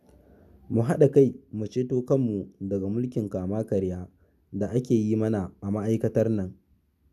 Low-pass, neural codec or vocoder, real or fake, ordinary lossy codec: 14.4 kHz; none; real; none